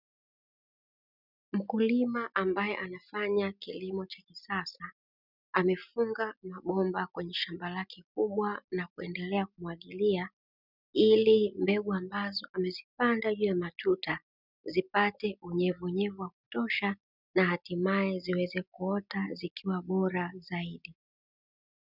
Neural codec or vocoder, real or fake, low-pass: none; real; 5.4 kHz